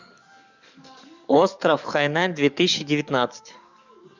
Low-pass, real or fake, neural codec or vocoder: 7.2 kHz; fake; codec, 44.1 kHz, 7.8 kbps, DAC